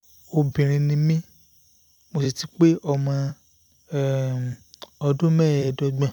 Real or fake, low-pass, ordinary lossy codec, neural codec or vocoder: fake; 19.8 kHz; none; vocoder, 44.1 kHz, 128 mel bands every 256 samples, BigVGAN v2